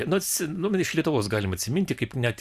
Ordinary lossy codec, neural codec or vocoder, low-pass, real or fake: Opus, 64 kbps; vocoder, 44.1 kHz, 128 mel bands every 512 samples, BigVGAN v2; 14.4 kHz; fake